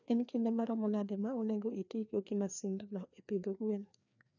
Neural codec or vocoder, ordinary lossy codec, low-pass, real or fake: codec, 16 kHz, 2 kbps, FreqCodec, larger model; none; 7.2 kHz; fake